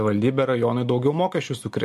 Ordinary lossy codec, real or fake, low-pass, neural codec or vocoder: MP3, 64 kbps; real; 14.4 kHz; none